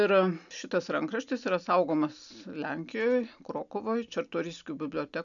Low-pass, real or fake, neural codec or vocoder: 7.2 kHz; real; none